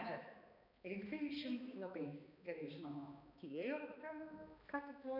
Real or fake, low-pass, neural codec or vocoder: fake; 5.4 kHz; codec, 16 kHz, 2 kbps, X-Codec, HuBERT features, trained on balanced general audio